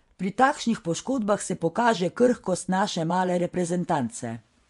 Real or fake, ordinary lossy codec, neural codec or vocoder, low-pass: fake; MP3, 64 kbps; vocoder, 24 kHz, 100 mel bands, Vocos; 10.8 kHz